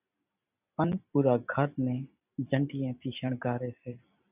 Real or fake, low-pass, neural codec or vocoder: fake; 3.6 kHz; vocoder, 44.1 kHz, 128 mel bands every 256 samples, BigVGAN v2